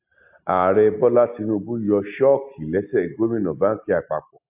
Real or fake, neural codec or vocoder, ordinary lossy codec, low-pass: real; none; AAC, 32 kbps; 3.6 kHz